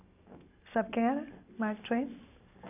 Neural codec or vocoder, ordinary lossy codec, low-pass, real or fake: none; none; 3.6 kHz; real